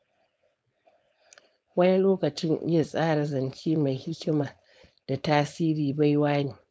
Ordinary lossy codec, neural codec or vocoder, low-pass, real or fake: none; codec, 16 kHz, 4.8 kbps, FACodec; none; fake